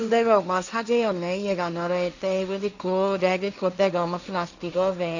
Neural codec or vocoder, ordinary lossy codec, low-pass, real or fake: codec, 16 kHz, 1.1 kbps, Voila-Tokenizer; none; 7.2 kHz; fake